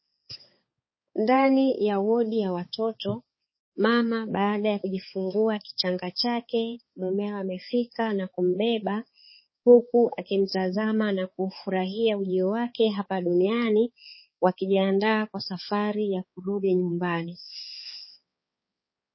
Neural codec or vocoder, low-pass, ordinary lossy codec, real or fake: codec, 16 kHz, 4 kbps, X-Codec, HuBERT features, trained on balanced general audio; 7.2 kHz; MP3, 24 kbps; fake